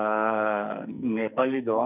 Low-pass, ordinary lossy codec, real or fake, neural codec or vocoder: 3.6 kHz; none; fake; codec, 44.1 kHz, 2.6 kbps, SNAC